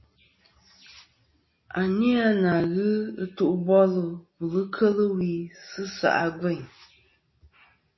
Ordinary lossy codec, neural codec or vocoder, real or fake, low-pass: MP3, 24 kbps; none; real; 7.2 kHz